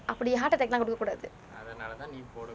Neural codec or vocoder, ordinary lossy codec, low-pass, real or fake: none; none; none; real